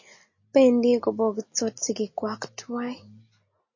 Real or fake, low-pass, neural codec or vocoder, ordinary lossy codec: real; 7.2 kHz; none; MP3, 32 kbps